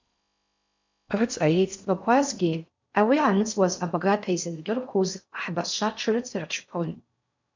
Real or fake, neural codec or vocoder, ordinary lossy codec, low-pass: fake; codec, 16 kHz in and 24 kHz out, 0.6 kbps, FocalCodec, streaming, 4096 codes; MP3, 64 kbps; 7.2 kHz